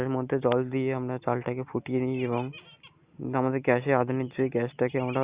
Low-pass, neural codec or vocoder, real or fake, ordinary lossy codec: 3.6 kHz; none; real; Opus, 64 kbps